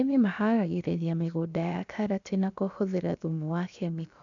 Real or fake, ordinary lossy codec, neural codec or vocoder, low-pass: fake; none; codec, 16 kHz, about 1 kbps, DyCAST, with the encoder's durations; 7.2 kHz